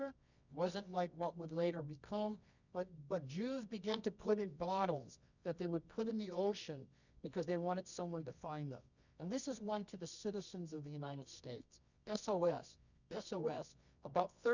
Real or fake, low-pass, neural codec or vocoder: fake; 7.2 kHz; codec, 24 kHz, 0.9 kbps, WavTokenizer, medium music audio release